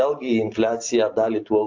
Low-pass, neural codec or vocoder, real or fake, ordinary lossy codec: 7.2 kHz; none; real; AAC, 48 kbps